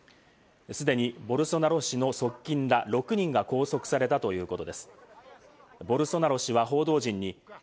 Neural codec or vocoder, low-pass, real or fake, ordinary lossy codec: none; none; real; none